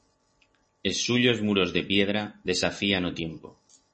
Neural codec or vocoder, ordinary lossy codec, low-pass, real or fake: none; MP3, 32 kbps; 10.8 kHz; real